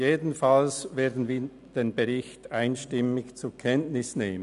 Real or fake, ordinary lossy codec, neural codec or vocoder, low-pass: real; none; none; 10.8 kHz